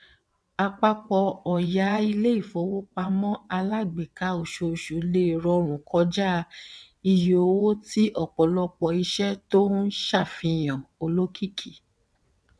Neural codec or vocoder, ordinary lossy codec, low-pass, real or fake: vocoder, 22.05 kHz, 80 mel bands, WaveNeXt; none; none; fake